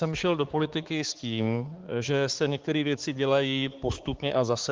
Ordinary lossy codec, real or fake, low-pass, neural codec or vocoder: Opus, 16 kbps; fake; 7.2 kHz; codec, 16 kHz, 4 kbps, X-Codec, HuBERT features, trained on balanced general audio